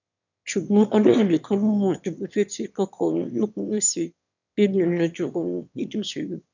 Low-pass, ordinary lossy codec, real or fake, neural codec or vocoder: 7.2 kHz; none; fake; autoencoder, 22.05 kHz, a latent of 192 numbers a frame, VITS, trained on one speaker